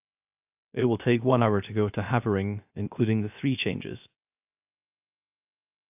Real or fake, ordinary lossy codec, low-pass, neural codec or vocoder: fake; AAC, 32 kbps; 3.6 kHz; codec, 16 kHz, 0.3 kbps, FocalCodec